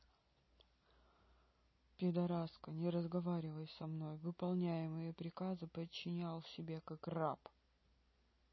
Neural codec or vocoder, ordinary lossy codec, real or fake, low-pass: none; MP3, 24 kbps; real; 7.2 kHz